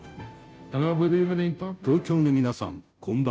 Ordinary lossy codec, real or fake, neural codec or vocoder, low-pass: none; fake; codec, 16 kHz, 0.5 kbps, FunCodec, trained on Chinese and English, 25 frames a second; none